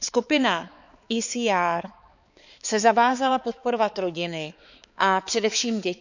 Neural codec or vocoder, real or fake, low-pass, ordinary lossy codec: codec, 16 kHz, 4 kbps, X-Codec, HuBERT features, trained on balanced general audio; fake; 7.2 kHz; none